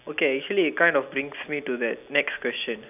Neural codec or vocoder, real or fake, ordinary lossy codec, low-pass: none; real; none; 3.6 kHz